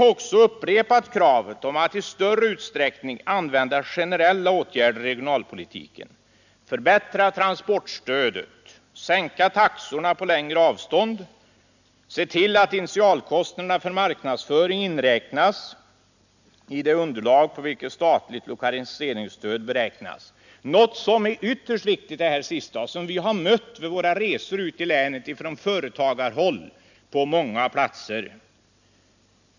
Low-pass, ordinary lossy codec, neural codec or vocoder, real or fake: 7.2 kHz; none; none; real